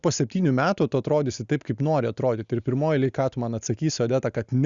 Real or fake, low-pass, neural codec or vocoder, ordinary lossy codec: real; 7.2 kHz; none; Opus, 64 kbps